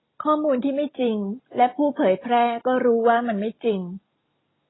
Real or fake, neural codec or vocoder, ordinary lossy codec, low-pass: real; none; AAC, 16 kbps; 7.2 kHz